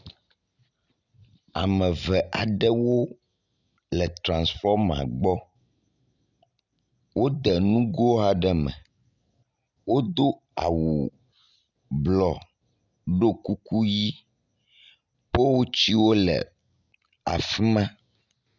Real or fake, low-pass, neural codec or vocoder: real; 7.2 kHz; none